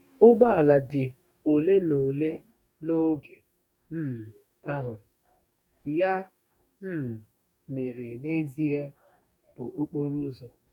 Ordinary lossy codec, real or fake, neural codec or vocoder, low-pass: none; fake; codec, 44.1 kHz, 2.6 kbps, DAC; 19.8 kHz